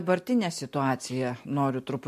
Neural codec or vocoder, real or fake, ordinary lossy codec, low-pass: none; real; MP3, 64 kbps; 14.4 kHz